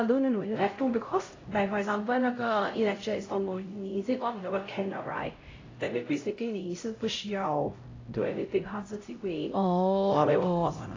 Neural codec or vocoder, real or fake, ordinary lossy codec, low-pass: codec, 16 kHz, 0.5 kbps, X-Codec, HuBERT features, trained on LibriSpeech; fake; AAC, 32 kbps; 7.2 kHz